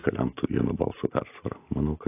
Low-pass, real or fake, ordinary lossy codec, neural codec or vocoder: 3.6 kHz; real; AAC, 24 kbps; none